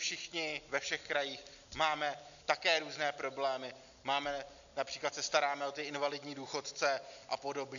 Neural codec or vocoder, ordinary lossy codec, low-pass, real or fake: none; MP3, 96 kbps; 7.2 kHz; real